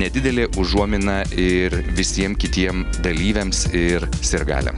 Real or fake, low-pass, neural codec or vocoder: real; 10.8 kHz; none